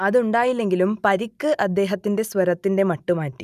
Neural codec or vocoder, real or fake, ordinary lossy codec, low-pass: none; real; none; 14.4 kHz